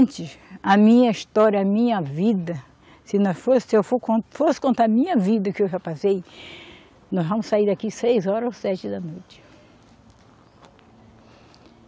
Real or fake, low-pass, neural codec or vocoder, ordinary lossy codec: real; none; none; none